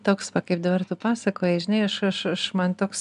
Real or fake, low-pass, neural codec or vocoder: real; 10.8 kHz; none